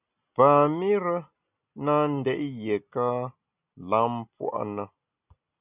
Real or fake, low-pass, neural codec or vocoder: real; 3.6 kHz; none